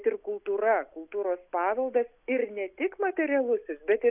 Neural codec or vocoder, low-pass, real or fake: none; 3.6 kHz; real